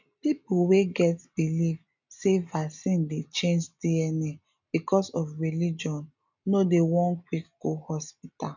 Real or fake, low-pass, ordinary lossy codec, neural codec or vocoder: real; 7.2 kHz; none; none